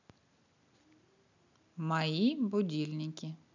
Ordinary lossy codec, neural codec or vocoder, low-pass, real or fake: none; none; 7.2 kHz; real